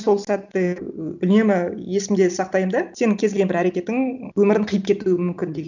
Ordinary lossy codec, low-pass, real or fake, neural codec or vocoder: none; 7.2 kHz; real; none